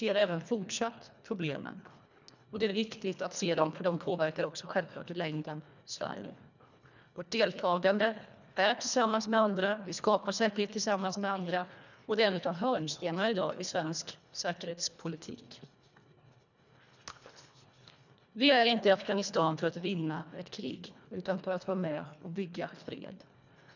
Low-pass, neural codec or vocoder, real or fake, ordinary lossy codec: 7.2 kHz; codec, 24 kHz, 1.5 kbps, HILCodec; fake; none